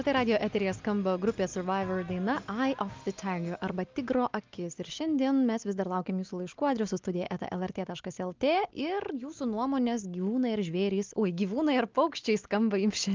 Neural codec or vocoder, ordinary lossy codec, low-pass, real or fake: none; Opus, 32 kbps; 7.2 kHz; real